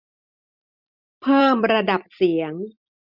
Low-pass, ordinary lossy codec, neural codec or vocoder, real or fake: 5.4 kHz; none; none; real